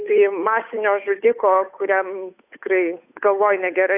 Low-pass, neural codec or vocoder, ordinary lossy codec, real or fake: 3.6 kHz; codec, 16 kHz, 8 kbps, FunCodec, trained on Chinese and English, 25 frames a second; AAC, 32 kbps; fake